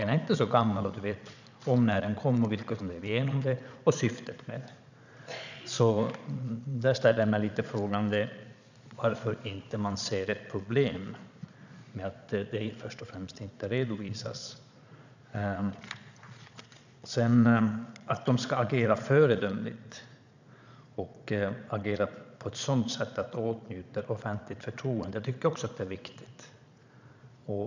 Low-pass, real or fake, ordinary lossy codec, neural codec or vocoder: 7.2 kHz; fake; none; vocoder, 22.05 kHz, 80 mel bands, Vocos